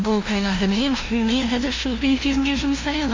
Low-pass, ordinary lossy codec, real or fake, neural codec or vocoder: 7.2 kHz; AAC, 32 kbps; fake; codec, 16 kHz, 0.5 kbps, FunCodec, trained on LibriTTS, 25 frames a second